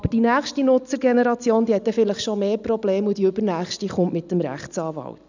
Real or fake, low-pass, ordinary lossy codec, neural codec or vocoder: real; 7.2 kHz; none; none